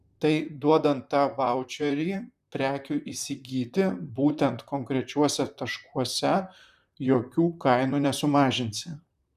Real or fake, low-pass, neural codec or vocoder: fake; 14.4 kHz; vocoder, 44.1 kHz, 128 mel bands, Pupu-Vocoder